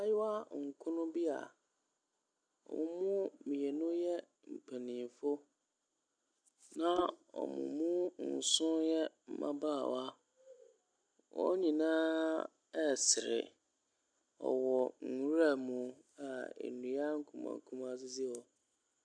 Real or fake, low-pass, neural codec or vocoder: real; 9.9 kHz; none